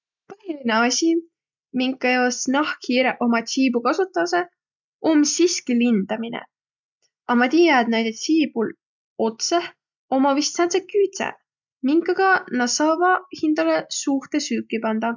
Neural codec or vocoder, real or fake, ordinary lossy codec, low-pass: none; real; none; 7.2 kHz